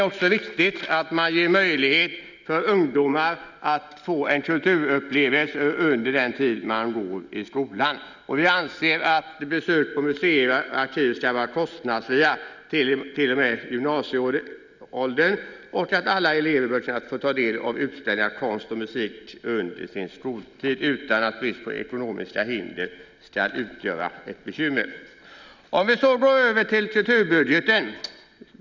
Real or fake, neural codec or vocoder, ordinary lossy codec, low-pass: real; none; none; 7.2 kHz